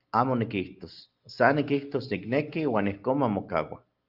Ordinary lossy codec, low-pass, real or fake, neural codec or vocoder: Opus, 32 kbps; 5.4 kHz; real; none